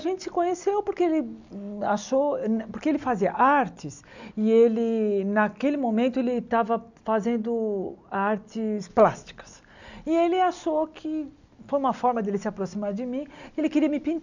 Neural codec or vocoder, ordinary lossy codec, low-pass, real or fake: none; none; 7.2 kHz; real